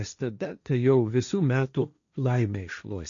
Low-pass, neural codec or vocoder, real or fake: 7.2 kHz; codec, 16 kHz, 1.1 kbps, Voila-Tokenizer; fake